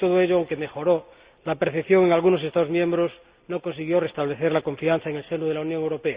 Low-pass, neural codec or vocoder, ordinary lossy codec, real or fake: 3.6 kHz; none; Opus, 64 kbps; real